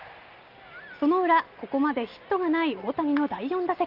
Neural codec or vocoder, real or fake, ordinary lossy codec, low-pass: none; real; Opus, 32 kbps; 5.4 kHz